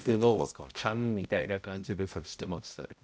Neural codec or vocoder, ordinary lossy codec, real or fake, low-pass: codec, 16 kHz, 0.5 kbps, X-Codec, HuBERT features, trained on balanced general audio; none; fake; none